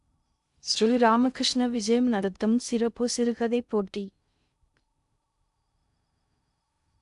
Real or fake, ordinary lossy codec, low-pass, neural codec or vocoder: fake; none; 10.8 kHz; codec, 16 kHz in and 24 kHz out, 0.6 kbps, FocalCodec, streaming, 2048 codes